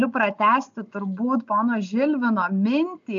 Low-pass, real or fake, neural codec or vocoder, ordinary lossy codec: 7.2 kHz; real; none; AAC, 64 kbps